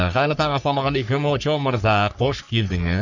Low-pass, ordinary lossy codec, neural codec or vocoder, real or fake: 7.2 kHz; none; codec, 44.1 kHz, 3.4 kbps, Pupu-Codec; fake